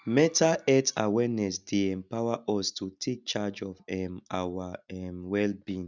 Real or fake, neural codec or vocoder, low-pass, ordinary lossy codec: real; none; 7.2 kHz; none